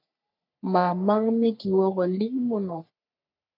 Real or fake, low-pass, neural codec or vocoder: fake; 5.4 kHz; codec, 44.1 kHz, 3.4 kbps, Pupu-Codec